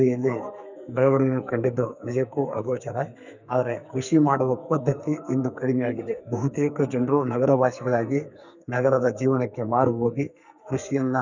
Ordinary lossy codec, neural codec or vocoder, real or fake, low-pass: none; codec, 44.1 kHz, 2.6 kbps, SNAC; fake; 7.2 kHz